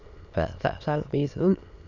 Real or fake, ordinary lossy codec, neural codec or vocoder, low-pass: fake; none; autoencoder, 22.05 kHz, a latent of 192 numbers a frame, VITS, trained on many speakers; 7.2 kHz